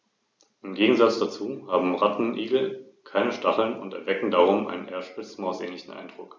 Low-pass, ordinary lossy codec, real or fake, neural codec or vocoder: none; none; real; none